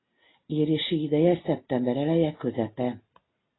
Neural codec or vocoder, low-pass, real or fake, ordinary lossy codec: none; 7.2 kHz; real; AAC, 16 kbps